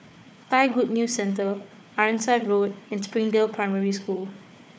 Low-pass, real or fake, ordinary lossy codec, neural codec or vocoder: none; fake; none; codec, 16 kHz, 4 kbps, FunCodec, trained on Chinese and English, 50 frames a second